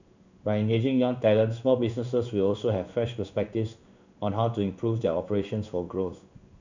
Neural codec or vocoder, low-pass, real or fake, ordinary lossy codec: codec, 16 kHz in and 24 kHz out, 1 kbps, XY-Tokenizer; 7.2 kHz; fake; none